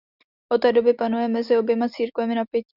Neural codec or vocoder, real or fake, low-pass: none; real; 5.4 kHz